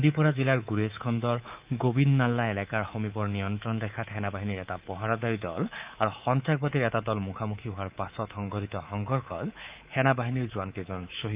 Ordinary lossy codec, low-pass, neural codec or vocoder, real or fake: Opus, 24 kbps; 3.6 kHz; codec, 24 kHz, 3.1 kbps, DualCodec; fake